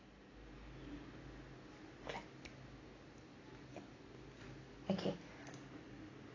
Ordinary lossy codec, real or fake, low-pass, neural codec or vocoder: AAC, 32 kbps; real; 7.2 kHz; none